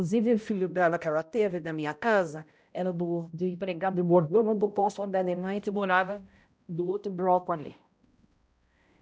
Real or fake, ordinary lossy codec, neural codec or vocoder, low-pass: fake; none; codec, 16 kHz, 0.5 kbps, X-Codec, HuBERT features, trained on balanced general audio; none